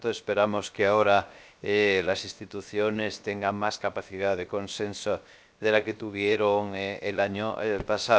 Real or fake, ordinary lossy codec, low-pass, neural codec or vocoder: fake; none; none; codec, 16 kHz, 0.7 kbps, FocalCodec